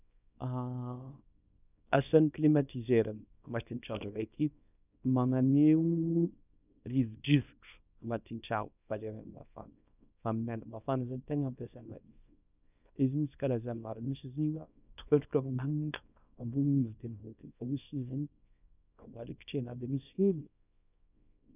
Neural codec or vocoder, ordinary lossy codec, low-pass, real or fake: codec, 24 kHz, 0.9 kbps, WavTokenizer, small release; none; 3.6 kHz; fake